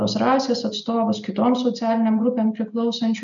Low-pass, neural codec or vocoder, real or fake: 7.2 kHz; none; real